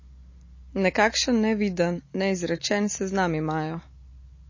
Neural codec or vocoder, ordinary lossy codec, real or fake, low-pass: none; MP3, 32 kbps; real; 7.2 kHz